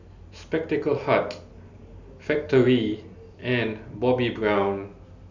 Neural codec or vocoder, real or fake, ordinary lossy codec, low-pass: none; real; none; 7.2 kHz